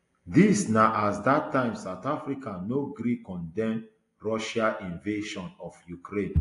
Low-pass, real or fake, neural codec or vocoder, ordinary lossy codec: 10.8 kHz; real; none; AAC, 48 kbps